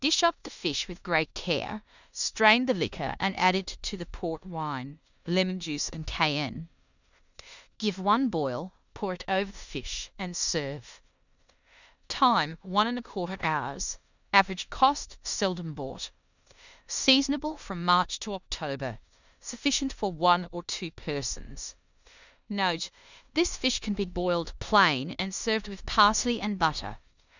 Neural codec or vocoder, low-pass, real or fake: codec, 16 kHz in and 24 kHz out, 0.9 kbps, LongCat-Audio-Codec, four codebook decoder; 7.2 kHz; fake